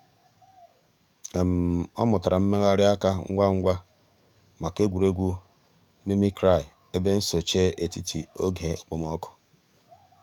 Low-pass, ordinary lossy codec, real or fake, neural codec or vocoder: 19.8 kHz; none; fake; codec, 44.1 kHz, 7.8 kbps, DAC